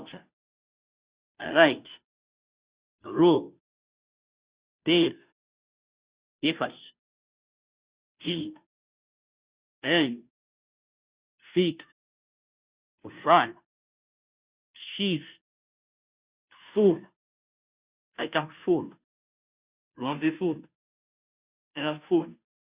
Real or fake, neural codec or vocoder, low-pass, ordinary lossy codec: fake; codec, 16 kHz, 0.5 kbps, FunCodec, trained on Chinese and English, 25 frames a second; 3.6 kHz; Opus, 64 kbps